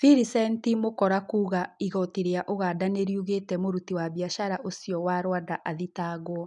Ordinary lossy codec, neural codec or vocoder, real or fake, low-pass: none; none; real; 10.8 kHz